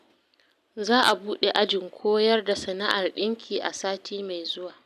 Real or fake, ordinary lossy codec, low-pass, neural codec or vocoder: real; none; 14.4 kHz; none